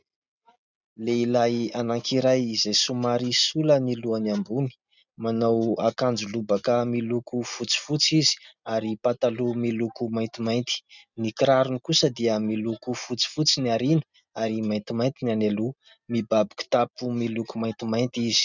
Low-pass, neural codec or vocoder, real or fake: 7.2 kHz; none; real